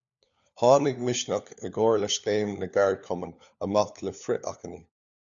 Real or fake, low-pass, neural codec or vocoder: fake; 7.2 kHz; codec, 16 kHz, 4 kbps, FunCodec, trained on LibriTTS, 50 frames a second